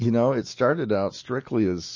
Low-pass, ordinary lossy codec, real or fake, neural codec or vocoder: 7.2 kHz; MP3, 32 kbps; real; none